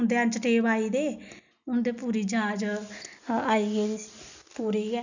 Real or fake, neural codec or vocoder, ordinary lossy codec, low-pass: real; none; none; 7.2 kHz